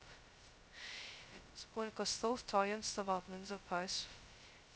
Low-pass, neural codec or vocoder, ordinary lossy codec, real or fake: none; codec, 16 kHz, 0.2 kbps, FocalCodec; none; fake